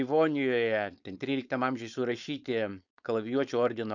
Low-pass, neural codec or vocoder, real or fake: 7.2 kHz; codec, 16 kHz, 4.8 kbps, FACodec; fake